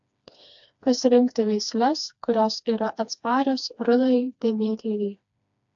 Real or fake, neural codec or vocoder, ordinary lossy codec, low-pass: fake; codec, 16 kHz, 2 kbps, FreqCodec, smaller model; AAC, 64 kbps; 7.2 kHz